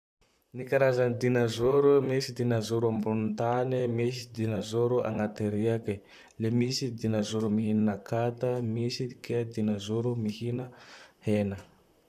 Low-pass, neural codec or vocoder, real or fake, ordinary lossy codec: 14.4 kHz; vocoder, 44.1 kHz, 128 mel bands, Pupu-Vocoder; fake; none